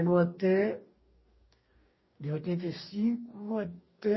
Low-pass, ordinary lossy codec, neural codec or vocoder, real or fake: 7.2 kHz; MP3, 24 kbps; codec, 44.1 kHz, 2.6 kbps, DAC; fake